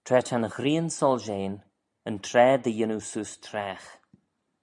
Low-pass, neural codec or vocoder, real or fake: 10.8 kHz; none; real